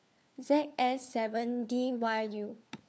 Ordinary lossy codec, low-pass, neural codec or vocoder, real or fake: none; none; codec, 16 kHz, 4 kbps, FunCodec, trained on LibriTTS, 50 frames a second; fake